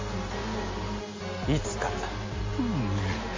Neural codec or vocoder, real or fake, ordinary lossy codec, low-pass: none; real; MP3, 32 kbps; 7.2 kHz